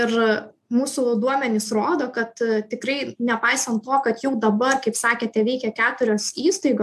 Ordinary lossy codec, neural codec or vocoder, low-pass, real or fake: AAC, 96 kbps; none; 14.4 kHz; real